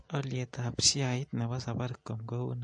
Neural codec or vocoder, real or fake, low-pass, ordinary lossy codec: none; real; 9.9 kHz; MP3, 48 kbps